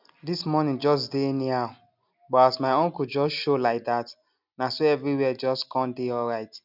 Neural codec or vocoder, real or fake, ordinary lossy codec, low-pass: none; real; none; 5.4 kHz